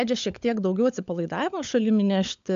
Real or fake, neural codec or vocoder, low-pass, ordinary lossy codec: fake; codec, 16 kHz, 16 kbps, FunCodec, trained on LibriTTS, 50 frames a second; 7.2 kHz; AAC, 64 kbps